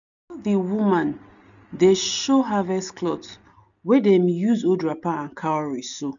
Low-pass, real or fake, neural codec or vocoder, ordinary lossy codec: 7.2 kHz; real; none; none